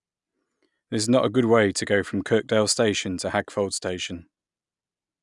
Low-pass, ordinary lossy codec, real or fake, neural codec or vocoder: 10.8 kHz; none; real; none